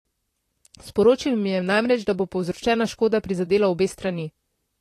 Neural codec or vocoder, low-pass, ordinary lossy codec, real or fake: vocoder, 44.1 kHz, 128 mel bands, Pupu-Vocoder; 14.4 kHz; AAC, 48 kbps; fake